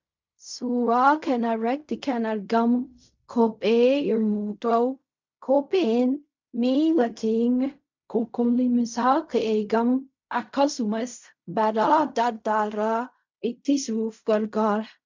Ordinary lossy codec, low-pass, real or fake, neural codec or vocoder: MP3, 64 kbps; 7.2 kHz; fake; codec, 16 kHz in and 24 kHz out, 0.4 kbps, LongCat-Audio-Codec, fine tuned four codebook decoder